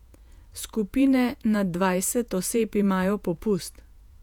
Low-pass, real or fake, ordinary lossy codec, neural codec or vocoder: 19.8 kHz; fake; none; vocoder, 48 kHz, 128 mel bands, Vocos